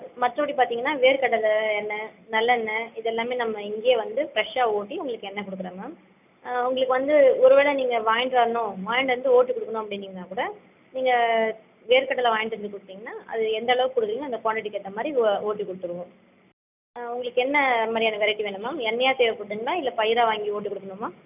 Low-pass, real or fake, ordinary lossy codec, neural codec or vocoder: 3.6 kHz; real; none; none